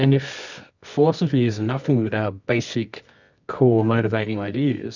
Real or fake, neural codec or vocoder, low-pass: fake; codec, 24 kHz, 0.9 kbps, WavTokenizer, medium music audio release; 7.2 kHz